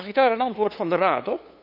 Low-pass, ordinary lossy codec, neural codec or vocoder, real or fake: 5.4 kHz; none; codec, 16 kHz, 2 kbps, FunCodec, trained on LibriTTS, 25 frames a second; fake